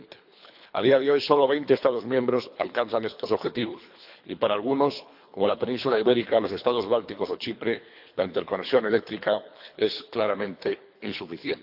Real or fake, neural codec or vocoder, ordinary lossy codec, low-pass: fake; codec, 24 kHz, 3 kbps, HILCodec; AAC, 48 kbps; 5.4 kHz